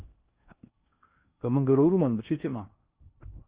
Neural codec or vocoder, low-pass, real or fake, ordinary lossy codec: codec, 16 kHz in and 24 kHz out, 0.6 kbps, FocalCodec, streaming, 4096 codes; 3.6 kHz; fake; Opus, 32 kbps